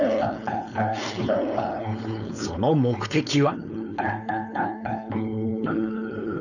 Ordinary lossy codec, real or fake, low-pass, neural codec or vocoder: none; fake; 7.2 kHz; codec, 16 kHz, 4.8 kbps, FACodec